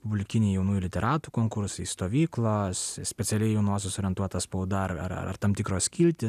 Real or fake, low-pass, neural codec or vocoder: real; 14.4 kHz; none